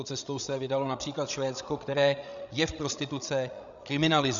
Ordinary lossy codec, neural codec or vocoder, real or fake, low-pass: MP3, 96 kbps; codec, 16 kHz, 16 kbps, FreqCodec, larger model; fake; 7.2 kHz